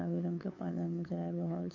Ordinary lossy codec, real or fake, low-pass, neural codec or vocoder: MP3, 32 kbps; fake; 7.2 kHz; codec, 16 kHz, 8 kbps, FunCodec, trained on LibriTTS, 25 frames a second